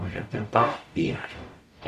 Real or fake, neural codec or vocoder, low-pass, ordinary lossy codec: fake; codec, 44.1 kHz, 0.9 kbps, DAC; 14.4 kHz; none